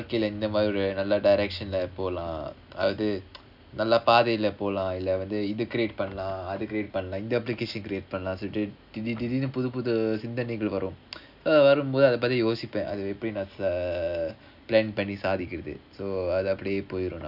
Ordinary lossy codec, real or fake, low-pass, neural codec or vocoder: none; real; 5.4 kHz; none